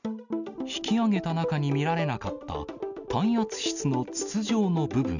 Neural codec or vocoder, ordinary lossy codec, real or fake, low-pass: none; none; real; 7.2 kHz